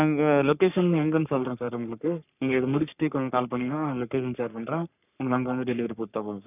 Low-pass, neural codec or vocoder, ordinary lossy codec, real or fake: 3.6 kHz; codec, 44.1 kHz, 3.4 kbps, Pupu-Codec; none; fake